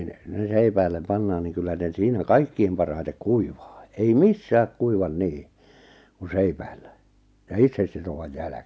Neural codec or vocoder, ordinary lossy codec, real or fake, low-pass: none; none; real; none